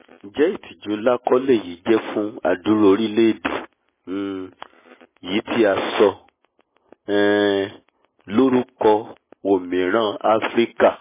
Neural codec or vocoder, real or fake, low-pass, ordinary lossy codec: none; real; 3.6 kHz; MP3, 16 kbps